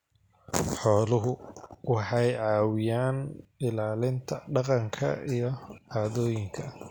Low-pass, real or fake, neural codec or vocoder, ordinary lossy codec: none; real; none; none